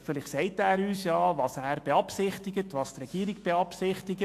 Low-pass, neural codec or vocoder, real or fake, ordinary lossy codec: 14.4 kHz; autoencoder, 48 kHz, 128 numbers a frame, DAC-VAE, trained on Japanese speech; fake; AAC, 64 kbps